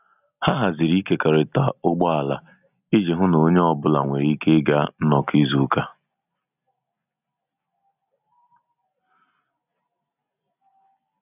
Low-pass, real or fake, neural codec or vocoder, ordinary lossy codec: 3.6 kHz; real; none; none